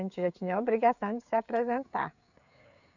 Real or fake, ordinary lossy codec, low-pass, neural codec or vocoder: fake; none; 7.2 kHz; codec, 16 kHz, 16 kbps, FreqCodec, smaller model